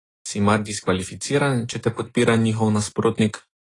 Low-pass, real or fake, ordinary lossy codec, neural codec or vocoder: 10.8 kHz; real; AAC, 32 kbps; none